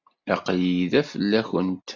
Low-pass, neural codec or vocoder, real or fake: 7.2 kHz; none; real